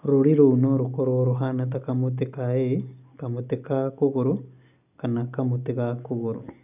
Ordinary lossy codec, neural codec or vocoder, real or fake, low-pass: none; none; real; 3.6 kHz